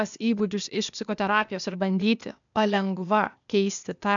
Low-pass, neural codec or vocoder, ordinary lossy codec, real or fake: 7.2 kHz; codec, 16 kHz, 0.8 kbps, ZipCodec; MP3, 96 kbps; fake